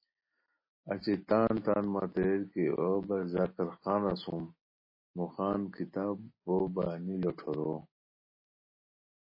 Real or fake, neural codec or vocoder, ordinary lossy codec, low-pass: real; none; MP3, 24 kbps; 5.4 kHz